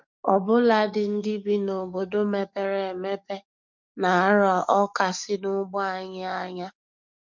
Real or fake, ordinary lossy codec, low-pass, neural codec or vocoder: fake; MP3, 64 kbps; 7.2 kHz; codec, 44.1 kHz, 7.8 kbps, DAC